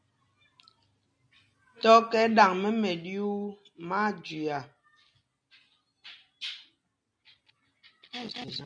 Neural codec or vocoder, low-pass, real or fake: none; 9.9 kHz; real